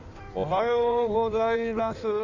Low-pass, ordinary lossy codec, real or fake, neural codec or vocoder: 7.2 kHz; none; fake; codec, 16 kHz in and 24 kHz out, 1.1 kbps, FireRedTTS-2 codec